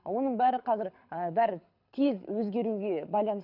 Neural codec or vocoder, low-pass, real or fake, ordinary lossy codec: codec, 24 kHz, 6 kbps, HILCodec; 5.4 kHz; fake; none